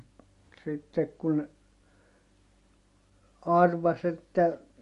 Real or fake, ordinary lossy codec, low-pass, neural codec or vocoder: real; MP3, 48 kbps; 14.4 kHz; none